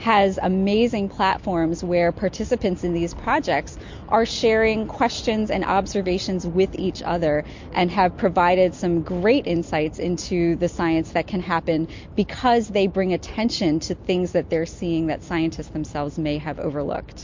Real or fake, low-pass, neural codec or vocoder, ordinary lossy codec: real; 7.2 kHz; none; MP3, 48 kbps